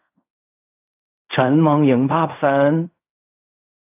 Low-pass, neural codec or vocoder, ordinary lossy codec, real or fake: 3.6 kHz; codec, 16 kHz in and 24 kHz out, 0.4 kbps, LongCat-Audio-Codec, fine tuned four codebook decoder; AAC, 32 kbps; fake